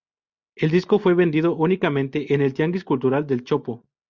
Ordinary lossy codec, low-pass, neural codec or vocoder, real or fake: Opus, 64 kbps; 7.2 kHz; none; real